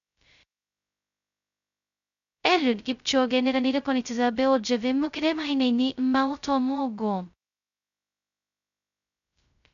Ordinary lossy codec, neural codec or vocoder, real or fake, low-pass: none; codec, 16 kHz, 0.2 kbps, FocalCodec; fake; 7.2 kHz